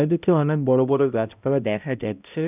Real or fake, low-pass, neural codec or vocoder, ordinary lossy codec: fake; 3.6 kHz; codec, 16 kHz, 0.5 kbps, X-Codec, HuBERT features, trained on balanced general audio; none